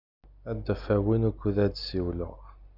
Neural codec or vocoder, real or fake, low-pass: none; real; 5.4 kHz